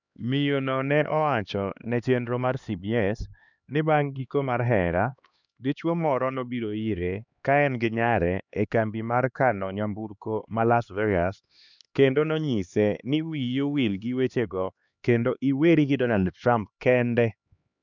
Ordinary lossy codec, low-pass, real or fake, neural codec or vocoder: none; 7.2 kHz; fake; codec, 16 kHz, 2 kbps, X-Codec, HuBERT features, trained on LibriSpeech